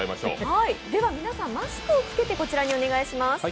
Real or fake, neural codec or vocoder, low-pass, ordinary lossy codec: real; none; none; none